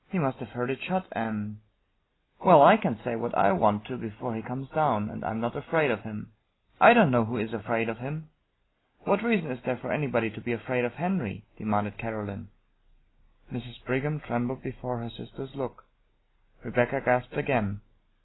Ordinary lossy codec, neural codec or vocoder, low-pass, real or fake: AAC, 16 kbps; none; 7.2 kHz; real